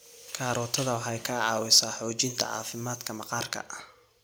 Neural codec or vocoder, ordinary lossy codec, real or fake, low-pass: none; none; real; none